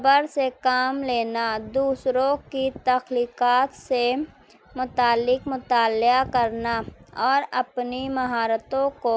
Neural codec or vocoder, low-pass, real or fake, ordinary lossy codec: none; none; real; none